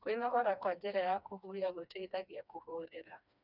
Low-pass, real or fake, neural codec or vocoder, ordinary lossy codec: 5.4 kHz; fake; codec, 16 kHz, 2 kbps, FreqCodec, smaller model; none